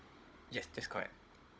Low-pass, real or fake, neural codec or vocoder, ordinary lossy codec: none; fake; codec, 16 kHz, 16 kbps, FunCodec, trained on Chinese and English, 50 frames a second; none